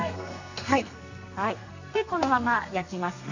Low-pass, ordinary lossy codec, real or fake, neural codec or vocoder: 7.2 kHz; AAC, 48 kbps; fake; codec, 32 kHz, 1.9 kbps, SNAC